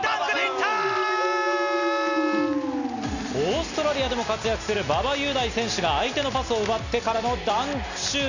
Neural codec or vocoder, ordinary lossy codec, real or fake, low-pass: none; none; real; 7.2 kHz